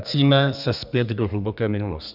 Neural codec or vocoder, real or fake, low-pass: codec, 32 kHz, 1.9 kbps, SNAC; fake; 5.4 kHz